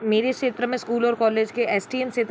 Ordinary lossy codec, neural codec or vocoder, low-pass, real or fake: none; none; none; real